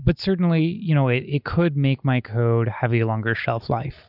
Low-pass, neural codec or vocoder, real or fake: 5.4 kHz; none; real